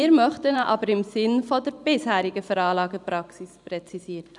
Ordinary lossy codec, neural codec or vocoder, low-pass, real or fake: none; vocoder, 44.1 kHz, 128 mel bands every 512 samples, BigVGAN v2; 10.8 kHz; fake